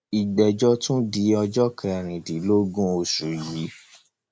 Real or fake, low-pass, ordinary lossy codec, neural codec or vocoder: real; none; none; none